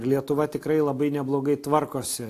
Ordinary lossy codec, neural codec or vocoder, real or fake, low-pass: AAC, 64 kbps; none; real; 14.4 kHz